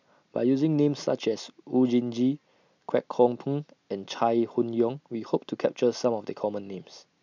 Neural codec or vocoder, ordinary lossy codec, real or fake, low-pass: none; none; real; 7.2 kHz